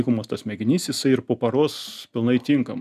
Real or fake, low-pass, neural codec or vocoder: real; 14.4 kHz; none